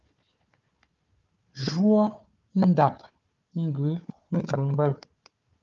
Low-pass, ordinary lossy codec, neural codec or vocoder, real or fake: 7.2 kHz; Opus, 32 kbps; codec, 16 kHz, 4 kbps, FunCodec, trained on Chinese and English, 50 frames a second; fake